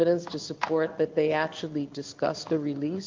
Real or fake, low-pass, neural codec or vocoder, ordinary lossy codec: fake; 7.2 kHz; codec, 16 kHz in and 24 kHz out, 1 kbps, XY-Tokenizer; Opus, 32 kbps